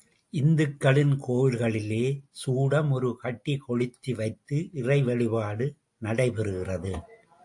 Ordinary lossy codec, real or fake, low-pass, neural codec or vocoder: AAC, 64 kbps; real; 10.8 kHz; none